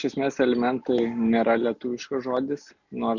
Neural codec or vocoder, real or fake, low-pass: none; real; 7.2 kHz